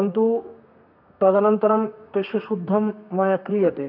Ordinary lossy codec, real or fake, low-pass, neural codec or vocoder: none; fake; 5.4 kHz; codec, 32 kHz, 1.9 kbps, SNAC